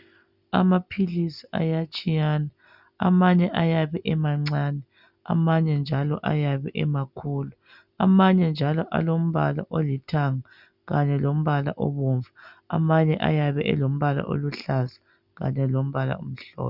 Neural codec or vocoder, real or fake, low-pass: none; real; 5.4 kHz